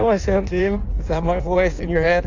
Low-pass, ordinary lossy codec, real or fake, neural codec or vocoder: 7.2 kHz; AAC, 48 kbps; fake; codec, 16 kHz in and 24 kHz out, 1.1 kbps, FireRedTTS-2 codec